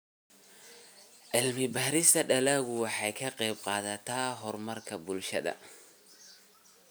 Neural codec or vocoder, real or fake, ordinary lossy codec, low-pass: none; real; none; none